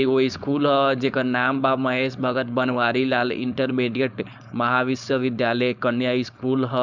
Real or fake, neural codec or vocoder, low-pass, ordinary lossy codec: fake; codec, 16 kHz, 4.8 kbps, FACodec; 7.2 kHz; none